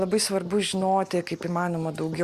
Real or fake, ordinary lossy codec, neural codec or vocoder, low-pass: real; Opus, 64 kbps; none; 14.4 kHz